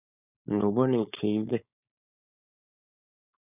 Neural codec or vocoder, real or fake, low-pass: codec, 16 kHz, 4.8 kbps, FACodec; fake; 3.6 kHz